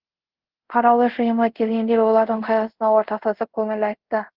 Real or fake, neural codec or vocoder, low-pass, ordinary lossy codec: fake; codec, 24 kHz, 0.5 kbps, DualCodec; 5.4 kHz; Opus, 16 kbps